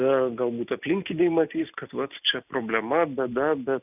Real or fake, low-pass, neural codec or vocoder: real; 3.6 kHz; none